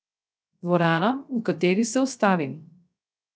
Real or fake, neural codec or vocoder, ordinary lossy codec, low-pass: fake; codec, 16 kHz, 0.3 kbps, FocalCodec; none; none